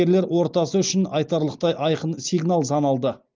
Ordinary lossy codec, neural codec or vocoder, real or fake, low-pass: Opus, 24 kbps; none; real; 7.2 kHz